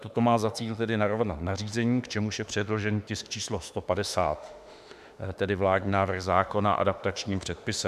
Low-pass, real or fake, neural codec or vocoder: 14.4 kHz; fake; autoencoder, 48 kHz, 32 numbers a frame, DAC-VAE, trained on Japanese speech